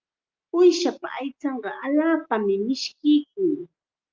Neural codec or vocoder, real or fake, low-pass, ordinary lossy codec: none; real; 7.2 kHz; Opus, 24 kbps